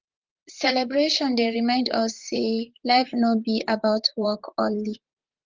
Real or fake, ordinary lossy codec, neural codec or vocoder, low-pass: fake; Opus, 32 kbps; vocoder, 44.1 kHz, 128 mel bands, Pupu-Vocoder; 7.2 kHz